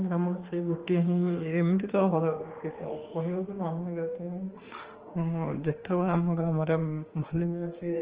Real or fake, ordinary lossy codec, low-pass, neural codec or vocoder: fake; Opus, 16 kbps; 3.6 kHz; autoencoder, 48 kHz, 32 numbers a frame, DAC-VAE, trained on Japanese speech